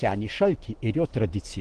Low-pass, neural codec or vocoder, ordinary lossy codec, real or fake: 9.9 kHz; none; Opus, 16 kbps; real